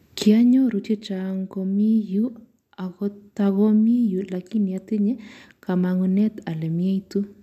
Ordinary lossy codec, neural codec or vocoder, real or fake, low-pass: AAC, 96 kbps; none; real; 14.4 kHz